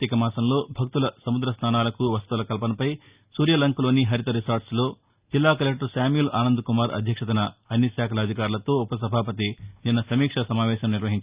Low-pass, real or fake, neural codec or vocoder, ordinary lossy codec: 3.6 kHz; real; none; Opus, 64 kbps